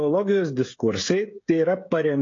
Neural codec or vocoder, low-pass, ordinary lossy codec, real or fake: codec, 16 kHz, 16 kbps, FreqCodec, smaller model; 7.2 kHz; AAC, 32 kbps; fake